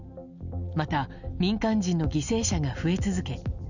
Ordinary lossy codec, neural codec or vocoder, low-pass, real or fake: none; none; 7.2 kHz; real